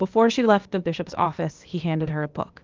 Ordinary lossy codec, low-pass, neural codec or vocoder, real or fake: Opus, 32 kbps; 7.2 kHz; codec, 16 kHz, 0.8 kbps, ZipCodec; fake